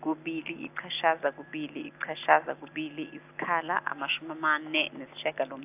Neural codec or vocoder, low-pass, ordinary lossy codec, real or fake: none; 3.6 kHz; AAC, 32 kbps; real